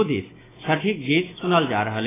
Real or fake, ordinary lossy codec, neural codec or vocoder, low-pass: fake; AAC, 16 kbps; autoencoder, 48 kHz, 128 numbers a frame, DAC-VAE, trained on Japanese speech; 3.6 kHz